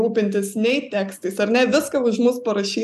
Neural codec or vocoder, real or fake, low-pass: none; real; 14.4 kHz